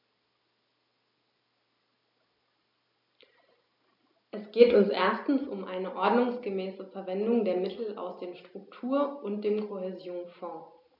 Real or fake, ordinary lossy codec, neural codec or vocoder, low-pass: real; none; none; 5.4 kHz